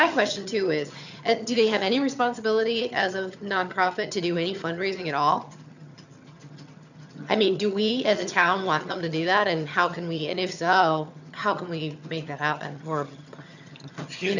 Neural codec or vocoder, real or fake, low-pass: vocoder, 22.05 kHz, 80 mel bands, HiFi-GAN; fake; 7.2 kHz